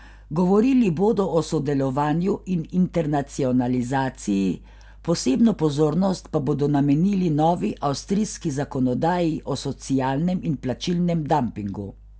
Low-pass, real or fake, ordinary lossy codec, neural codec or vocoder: none; real; none; none